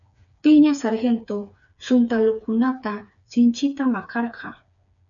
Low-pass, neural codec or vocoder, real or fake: 7.2 kHz; codec, 16 kHz, 4 kbps, FreqCodec, smaller model; fake